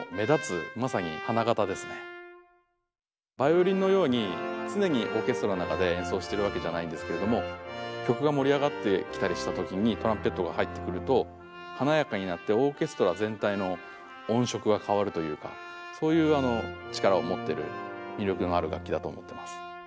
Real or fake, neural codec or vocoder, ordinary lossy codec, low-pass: real; none; none; none